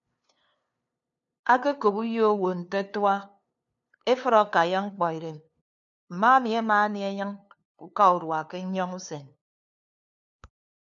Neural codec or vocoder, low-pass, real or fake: codec, 16 kHz, 2 kbps, FunCodec, trained on LibriTTS, 25 frames a second; 7.2 kHz; fake